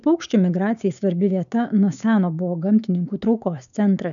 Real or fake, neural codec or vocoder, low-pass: fake; codec, 16 kHz, 6 kbps, DAC; 7.2 kHz